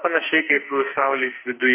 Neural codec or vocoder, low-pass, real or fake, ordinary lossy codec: codec, 44.1 kHz, 2.6 kbps, SNAC; 3.6 kHz; fake; MP3, 24 kbps